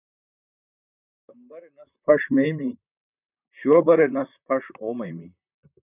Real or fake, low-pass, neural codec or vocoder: fake; 3.6 kHz; vocoder, 44.1 kHz, 128 mel bands, Pupu-Vocoder